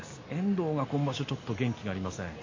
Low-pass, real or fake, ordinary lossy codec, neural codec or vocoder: 7.2 kHz; real; MP3, 32 kbps; none